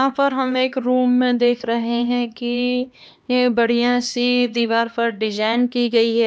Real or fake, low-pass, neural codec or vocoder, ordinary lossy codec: fake; none; codec, 16 kHz, 2 kbps, X-Codec, HuBERT features, trained on LibriSpeech; none